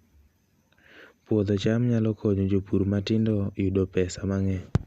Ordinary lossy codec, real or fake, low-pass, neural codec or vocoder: Opus, 64 kbps; real; 14.4 kHz; none